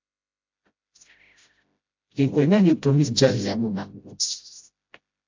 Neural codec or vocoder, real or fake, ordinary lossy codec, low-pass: codec, 16 kHz, 0.5 kbps, FreqCodec, smaller model; fake; MP3, 48 kbps; 7.2 kHz